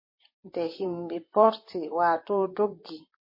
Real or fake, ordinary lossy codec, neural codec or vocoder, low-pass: fake; MP3, 24 kbps; vocoder, 44.1 kHz, 128 mel bands, Pupu-Vocoder; 5.4 kHz